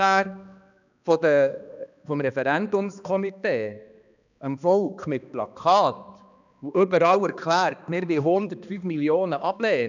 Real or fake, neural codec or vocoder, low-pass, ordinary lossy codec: fake; codec, 16 kHz, 2 kbps, X-Codec, HuBERT features, trained on balanced general audio; 7.2 kHz; none